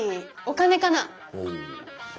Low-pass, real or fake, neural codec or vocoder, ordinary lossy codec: none; real; none; none